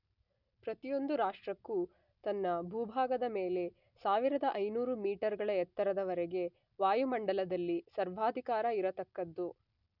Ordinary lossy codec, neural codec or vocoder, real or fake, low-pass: none; none; real; 5.4 kHz